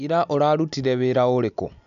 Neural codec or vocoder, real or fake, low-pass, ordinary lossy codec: none; real; 7.2 kHz; none